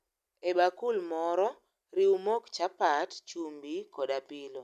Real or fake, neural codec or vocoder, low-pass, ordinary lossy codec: real; none; 14.4 kHz; none